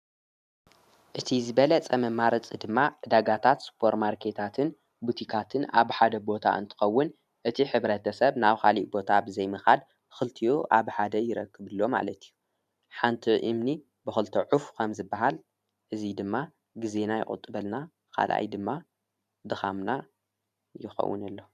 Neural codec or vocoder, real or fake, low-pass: vocoder, 44.1 kHz, 128 mel bands every 512 samples, BigVGAN v2; fake; 14.4 kHz